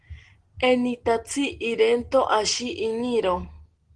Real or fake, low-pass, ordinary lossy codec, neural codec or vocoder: real; 9.9 kHz; Opus, 16 kbps; none